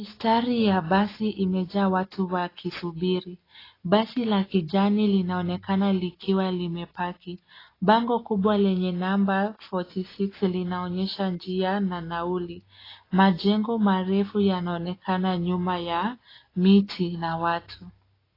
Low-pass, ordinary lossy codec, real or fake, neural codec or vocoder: 5.4 kHz; AAC, 24 kbps; real; none